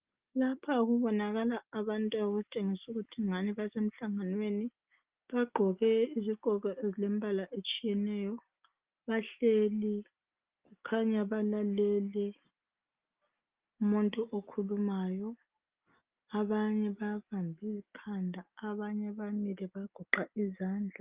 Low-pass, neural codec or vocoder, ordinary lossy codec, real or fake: 3.6 kHz; none; Opus, 32 kbps; real